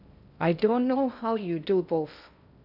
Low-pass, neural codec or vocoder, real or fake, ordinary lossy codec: 5.4 kHz; codec, 16 kHz in and 24 kHz out, 0.6 kbps, FocalCodec, streaming, 2048 codes; fake; none